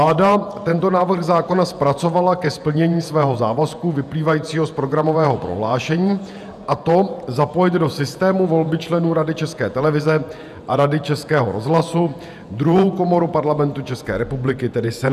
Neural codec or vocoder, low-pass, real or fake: vocoder, 48 kHz, 128 mel bands, Vocos; 14.4 kHz; fake